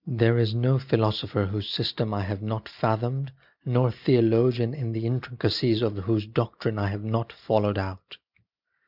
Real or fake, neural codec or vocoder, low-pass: real; none; 5.4 kHz